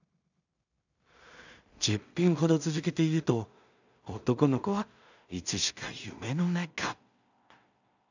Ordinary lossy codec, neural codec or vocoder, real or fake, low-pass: none; codec, 16 kHz in and 24 kHz out, 0.4 kbps, LongCat-Audio-Codec, two codebook decoder; fake; 7.2 kHz